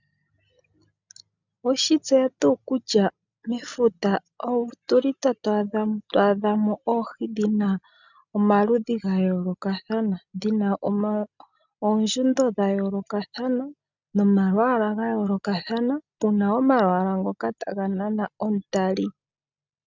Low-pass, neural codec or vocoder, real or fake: 7.2 kHz; none; real